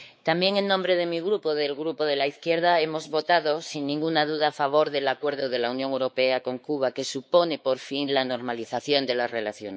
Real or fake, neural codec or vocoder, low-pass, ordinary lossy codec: fake; codec, 16 kHz, 4 kbps, X-Codec, WavLM features, trained on Multilingual LibriSpeech; none; none